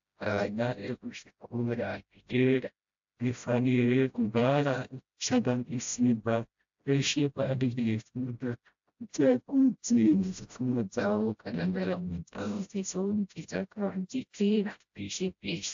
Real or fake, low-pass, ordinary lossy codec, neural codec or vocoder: fake; 7.2 kHz; AAC, 64 kbps; codec, 16 kHz, 0.5 kbps, FreqCodec, smaller model